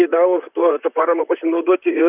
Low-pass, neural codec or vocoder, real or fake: 3.6 kHz; vocoder, 44.1 kHz, 128 mel bands, Pupu-Vocoder; fake